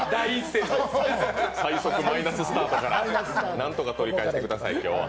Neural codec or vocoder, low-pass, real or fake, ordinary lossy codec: none; none; real; none